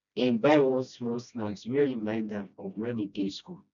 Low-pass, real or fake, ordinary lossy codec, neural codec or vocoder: 7.2 kHz; fake; none; codec, 16 kHz, 1 kbps, FreqCodec, smaller model